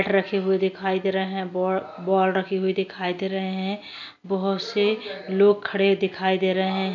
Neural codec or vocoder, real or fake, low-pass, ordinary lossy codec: none; real; 7.2 kHz; none